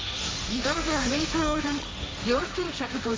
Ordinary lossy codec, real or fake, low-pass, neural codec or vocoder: none; fake; none; codec, 16 kHz, 1.1 kbps, Voila-Tokenizer